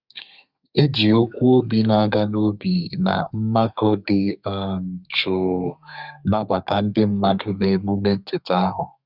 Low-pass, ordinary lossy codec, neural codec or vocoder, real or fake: 5.4 kHz; Opus, 64 kbps; codec, 32 kHz, 1.9 kbps, SNAC; fake